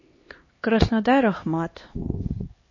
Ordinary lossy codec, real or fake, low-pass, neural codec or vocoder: MP3, 32 kbps; fake; 7.2 kHz; codec, 16 kHz, 2 kbps, X-Codec, HuBERT features, trained on LibriSpeech